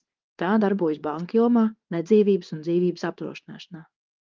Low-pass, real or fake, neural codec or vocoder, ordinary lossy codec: 7.2 kHz; fake; codec, 16 kHz in and 24 kHz out, 1 kbps, XY-Tokenizer; Opus, 24 kbps